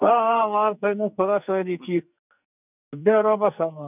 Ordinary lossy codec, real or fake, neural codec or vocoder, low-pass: none; fake; codec, 44.1 kHz, 2.6 kbps, SNAC; 3.6 kHz